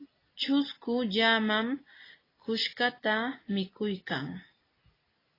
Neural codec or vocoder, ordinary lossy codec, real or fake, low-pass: none; AAC, 24 kbps; real; 5.4 kHz